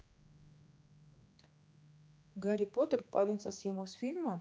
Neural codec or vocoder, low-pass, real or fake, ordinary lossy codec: codec, 16 kHz, 2 kbps, X-Codec, HuBERT features, trained on general audio; none; fake; none